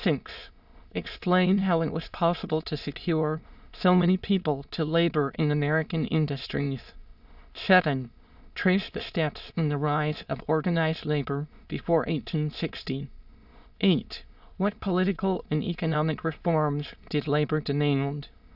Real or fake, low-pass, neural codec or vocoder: fake; 5.4 kHz; autoencoder, 22.05 kHz, a latent of 192 numbers a frame, VITS, trained on many speakers